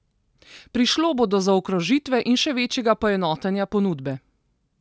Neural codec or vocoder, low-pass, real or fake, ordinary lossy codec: none; none; real; none